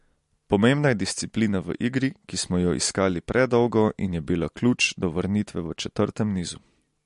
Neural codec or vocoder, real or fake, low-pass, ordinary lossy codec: none; real; 14.4 kHz; MP3, 48 kbps